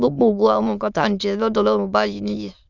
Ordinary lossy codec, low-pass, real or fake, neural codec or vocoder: none; 7.2 kHz; fake; autoencoder, 22.05 kHz, a latent of 192 numbers a frame, VITS, trained on many speakers